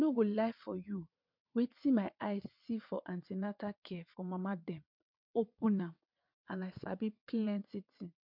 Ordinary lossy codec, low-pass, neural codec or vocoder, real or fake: MP3, 48 kbps; 5.4 kHz; vocoder, 22.05 kHz, 80 mel bands, WaveNeXt; fake